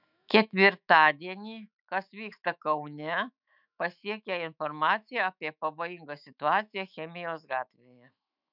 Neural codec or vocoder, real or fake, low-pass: autoencoder, 48 kHz, 128 numbers a frame, DAC-VAE, trained on Japanese speech; fake; 5.4 kHz